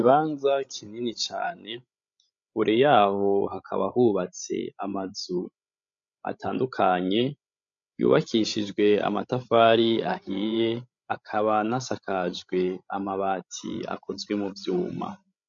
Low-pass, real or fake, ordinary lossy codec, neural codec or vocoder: 7.2 kHz; fake; MP3, 48 kbps; codec, 16 kHz, 16 kbps, FreqCodec, larger model